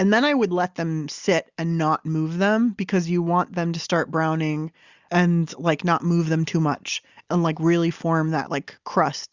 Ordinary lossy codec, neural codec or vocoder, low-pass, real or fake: Opus, 64 kbps; none; 7.2 kHz; real